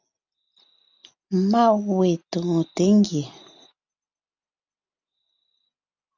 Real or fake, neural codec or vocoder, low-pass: real; none; 7.2 kHz